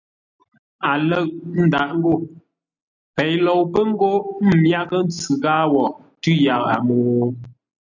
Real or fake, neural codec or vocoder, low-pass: real; none; 7.2 kHz